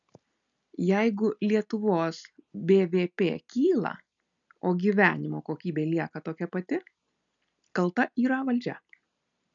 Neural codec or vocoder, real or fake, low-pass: none; real; 7.2 kHz